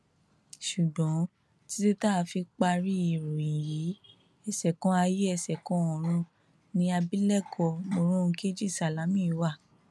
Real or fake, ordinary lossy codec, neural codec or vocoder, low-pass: real; none; none; none